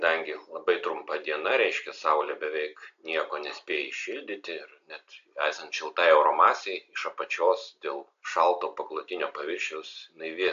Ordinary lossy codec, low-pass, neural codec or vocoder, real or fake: AAC, 64 kbps; 7.2 kHz; none; real